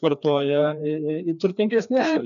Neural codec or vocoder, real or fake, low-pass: codec, 16 kHz, 2 kbps, FreqCodec, larger model; fake; 7.2 kHz